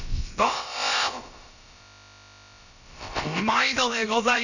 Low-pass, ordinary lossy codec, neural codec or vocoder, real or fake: 7.2 kHz; none; codec, 16 kHz, about 1 kbps, DyCAST, with the encoder's durations; fake